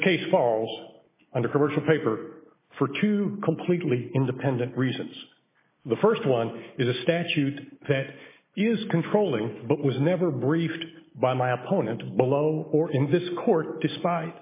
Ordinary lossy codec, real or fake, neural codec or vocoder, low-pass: MP3, 16 kbps; real; none; 3.6 kHz